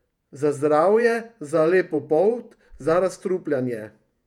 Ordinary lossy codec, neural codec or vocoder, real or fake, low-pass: none; vocoder, 48 kHz, 128 mel bands, Vocos; fake; 19.8 kHz